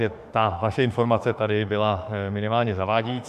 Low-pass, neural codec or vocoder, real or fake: 14.4 kHz; autoencoder, 48 kHz, 32 numbers a frame, DAC-VAE, trained on Japanese speech; fake